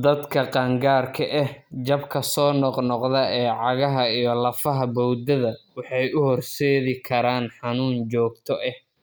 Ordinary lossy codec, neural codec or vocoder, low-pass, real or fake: none; none; none; real